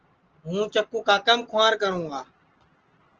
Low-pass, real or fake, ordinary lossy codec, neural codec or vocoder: 7.2 kHz; real; Opus, 16 kbps; none